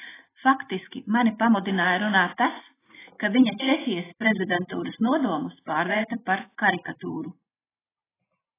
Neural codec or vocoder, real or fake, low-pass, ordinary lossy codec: none; real; 3.6 kHz; AAC, 16 kbps